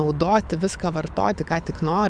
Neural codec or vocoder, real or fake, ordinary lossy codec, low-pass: codec, 24 kHz, 6 kbps, HILCodec; fake; MP3, 96 kbps; 9.9 kHz